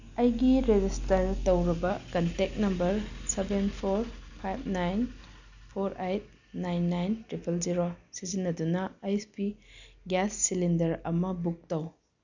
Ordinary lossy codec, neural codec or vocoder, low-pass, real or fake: none; none; 7.2 kHz; real